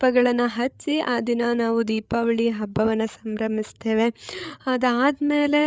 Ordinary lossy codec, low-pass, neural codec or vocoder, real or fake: none; none; codec, 16 kHz, 8 kbps, FreqCodec, larger model; fake